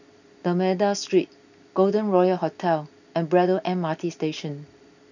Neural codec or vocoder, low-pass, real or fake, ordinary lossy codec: none; 7.2 kHz; real; none